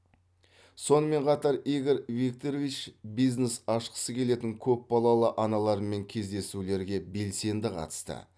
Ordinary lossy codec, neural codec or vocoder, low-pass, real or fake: none; none; none; real